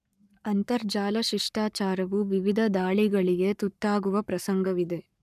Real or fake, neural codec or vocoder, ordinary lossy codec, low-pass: fake; codec, 44.1 kHz, 7.8 kbps, Pupu-Codec; none; 14.4 kHz